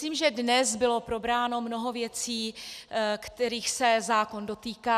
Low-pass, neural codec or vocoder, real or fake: 14.4 kHz; none; real